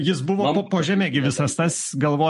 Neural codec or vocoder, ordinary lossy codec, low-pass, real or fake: none; MP3, 48 kbps; 14.4 kHz; real